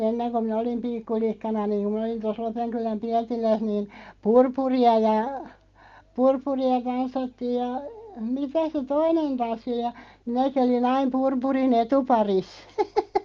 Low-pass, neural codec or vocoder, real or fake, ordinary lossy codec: 7.2 kHz; none; real; Opus, 32 kbps